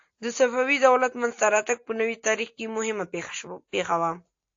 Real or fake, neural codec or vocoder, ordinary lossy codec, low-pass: real; none; AAC, 48 kbps; 7.2 kHz